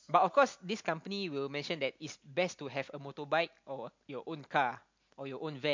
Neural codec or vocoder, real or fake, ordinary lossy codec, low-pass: none; real; MP3, 48 kbps; 7.2 kHz